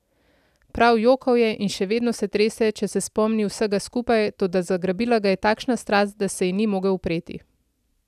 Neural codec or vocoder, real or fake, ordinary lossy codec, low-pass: none; real; none; 14.4 kHz